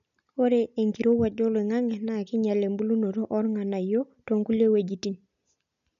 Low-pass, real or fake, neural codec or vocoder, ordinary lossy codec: 7.2 kHz; real; none; none